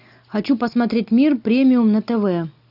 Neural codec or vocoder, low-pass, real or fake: none; 5.4 kHz; real